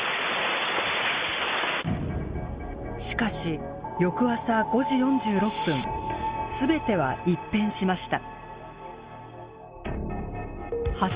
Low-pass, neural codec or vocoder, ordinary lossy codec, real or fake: 3.6 kHz; none; Opus, 32 kbps; real